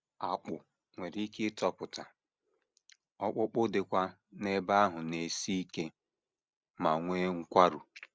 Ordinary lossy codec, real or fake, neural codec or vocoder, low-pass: none; real; none; none